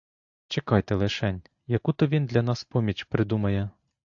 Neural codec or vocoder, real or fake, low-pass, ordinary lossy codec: none; real; 7.2 kHz; AAC, 64 kbps